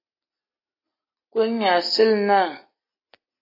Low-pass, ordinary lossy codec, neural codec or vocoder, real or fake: 5.4 kHz; AAC, 32 kbps; none; real